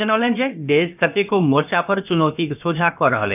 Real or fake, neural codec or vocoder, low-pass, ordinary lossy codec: fake; codec, 16 kHz, about 1 kbps, DyCAST, with the encoder's durations; 3.6 kHz; none